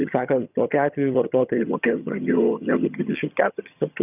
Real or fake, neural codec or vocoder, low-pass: fake; vocoder, 22.05 kHz, 80 mel bands, HiFi-GAN; 3.6 kHz